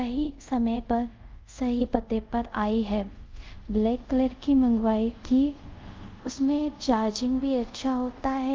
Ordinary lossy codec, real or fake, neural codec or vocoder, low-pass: Opus, 32 kbps; fake; codec, 24 kHz, 0.5 kbps, DualCodec; 7.2 kHz